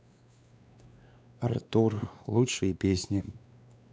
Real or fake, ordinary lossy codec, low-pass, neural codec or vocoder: fake; none; none; codec, 16 kHz, 2 kbps, X-Codec, WavLM features, trained on Multilingual LibriSpeech